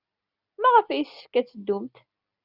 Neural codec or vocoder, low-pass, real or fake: none; 5.4 kHz; real